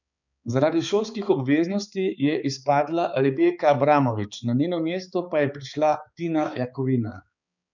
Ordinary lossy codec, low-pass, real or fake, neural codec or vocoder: none; 7.2 kHz; fake; codec, 16 kHz, 4 kbps, X-Codec, HuBERT features, trained on balanced general audio